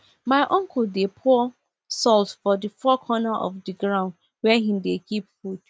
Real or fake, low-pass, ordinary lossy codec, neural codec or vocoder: real; none; none; none